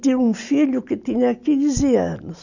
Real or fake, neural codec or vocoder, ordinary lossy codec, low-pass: real; none; none; 7.2 kHz